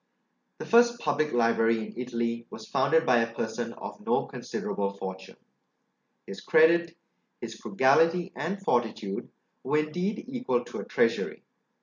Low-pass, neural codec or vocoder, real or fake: 7.2 kHz; none; real